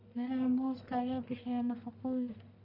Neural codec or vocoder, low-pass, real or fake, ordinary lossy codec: codec, 44.1 kHz, 1.7 kbps, Pupu-Codec; 5.4 kHz; fake; none